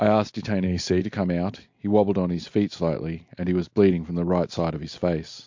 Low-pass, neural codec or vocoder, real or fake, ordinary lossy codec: 7.2 kHz; none; real; MP3, 48 kbps